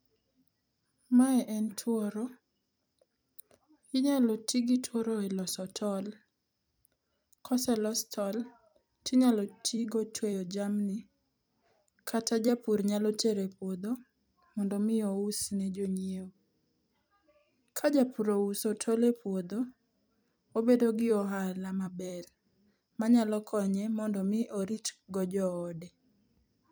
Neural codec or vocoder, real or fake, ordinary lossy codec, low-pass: vocoder, 44.1 kHz, 128 mel bands every 256 samples, BigVGAN v2; fake; none; none